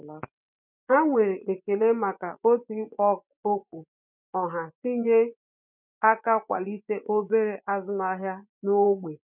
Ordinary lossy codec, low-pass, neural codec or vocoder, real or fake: none; 3.6 kHz; vocoder, 24 kHz, 100 mel bands, Vocos; fake